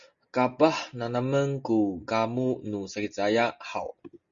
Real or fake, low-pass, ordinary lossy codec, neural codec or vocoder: real; 7.2 kHz; Opus, 64 kbps; none